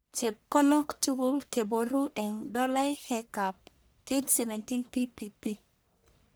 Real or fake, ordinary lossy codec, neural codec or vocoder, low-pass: fake; none; codec, 44.1 kHz, 1.7 kbps, Pupu-Codec; none